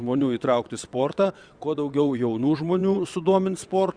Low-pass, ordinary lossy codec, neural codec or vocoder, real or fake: 9.9 kHz; Opus, 64 kbps; vocoder, 22.05 kHz, 80 mel bands, WaveNeXt; fake